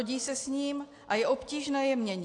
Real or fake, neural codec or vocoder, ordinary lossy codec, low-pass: real; none; AAC, 48 kbps; 10.8 kHz